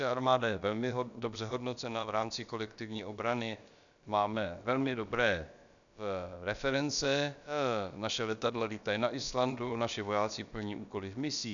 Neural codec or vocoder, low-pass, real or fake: codec, 16 kHz, about 1 kbps, DyCAST, with the encoder's durations; 7.2 kHz; fake